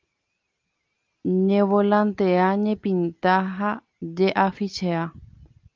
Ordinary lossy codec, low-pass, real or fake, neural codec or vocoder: Opus, 24 kbps; 7.2 kHz; real; none